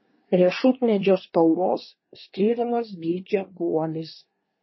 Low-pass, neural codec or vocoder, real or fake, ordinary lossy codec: 7.2 kHz; codec, 24 kHz, 1 kbps, SNAC; fake; MP3, 24 kbps